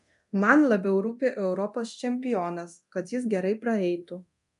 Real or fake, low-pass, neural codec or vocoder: fake; 10.8 kHz; codec, 24 kHz, 0.9 kbps, DualCodec